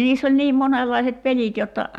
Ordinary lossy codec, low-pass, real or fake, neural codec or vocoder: none; 19.8 kHz; real; none